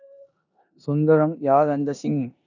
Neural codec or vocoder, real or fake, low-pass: codec, 16 kHz in and 24 kHz out, 0.9 kbps, LongCat-Audio-Codec, four codebook decoder; fake; 7.2 kHz